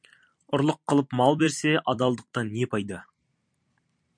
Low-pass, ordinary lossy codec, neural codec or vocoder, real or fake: 9.9 kHz; MP3, 64 kbps; vocoder, 44.1 kHz, 128 mel bands every 256 samples, BigVGAN v2; fake